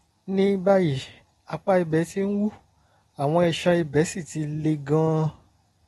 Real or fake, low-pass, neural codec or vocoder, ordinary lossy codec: real; 19.8 kHz; none; AAC, 32 kbps